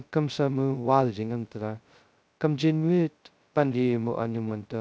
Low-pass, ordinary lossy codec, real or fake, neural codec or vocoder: none; none; fake; codec, 16 kHz, 0.2 kbps, FocalCodec